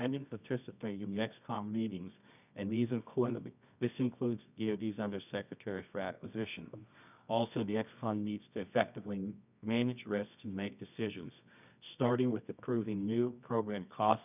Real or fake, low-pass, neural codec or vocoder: fake; 3.6 kHz; codec, 24 kHz, 0.9 kbps, WavTokenizer, medium music audio release